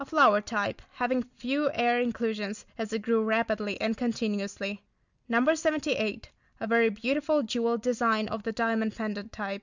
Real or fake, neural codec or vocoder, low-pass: real; none; 7.2 kHz